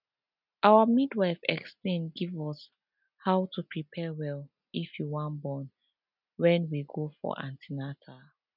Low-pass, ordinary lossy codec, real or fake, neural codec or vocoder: 5.4 kHz; none; real; none